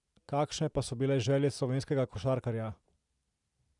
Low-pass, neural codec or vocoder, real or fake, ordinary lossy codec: 10.8 kHz; none; real; none